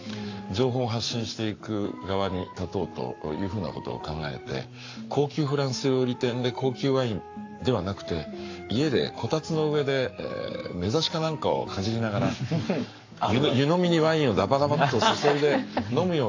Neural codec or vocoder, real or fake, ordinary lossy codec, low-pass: codec, 44.1 kHz, 7.8 kbps, Pupu-Codec; fake; AAC, 48 kbps; 7.2 kHz